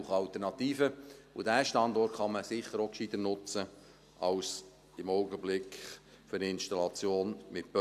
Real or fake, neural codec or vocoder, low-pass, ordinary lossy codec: real; none; 14.4 kHz; none